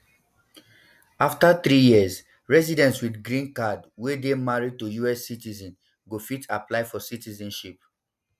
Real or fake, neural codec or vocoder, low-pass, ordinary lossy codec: real; none; 14.4 kHz; none